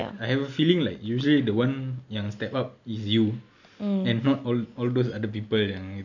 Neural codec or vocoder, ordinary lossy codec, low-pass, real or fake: none; none; 7.2 kHz; real